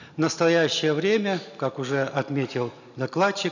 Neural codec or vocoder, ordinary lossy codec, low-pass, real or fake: none; none; 7.2 kHz; real